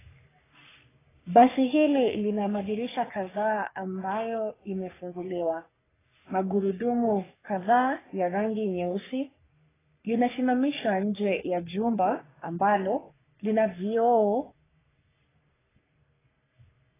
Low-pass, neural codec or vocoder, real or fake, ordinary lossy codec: 3.6 kHz; codec, 44.1 kHz, 3.4 kbps, Pupu-Codec; fake; AAC, 16 kbps